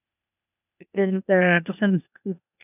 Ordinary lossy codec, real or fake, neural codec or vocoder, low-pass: none; fake; codec, 16 kHz, 0.8 kbps, ZipCodec; 3.6 kHz